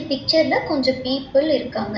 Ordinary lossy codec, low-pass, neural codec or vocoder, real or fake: none; 7.2 kHz; none; real